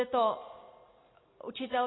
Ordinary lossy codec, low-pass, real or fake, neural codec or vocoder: AAC, 16 kbps; 7.2 kHz; real; none